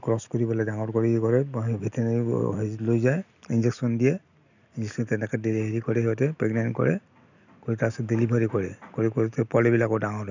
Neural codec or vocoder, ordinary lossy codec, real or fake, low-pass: none; none; real; 7.2 kHz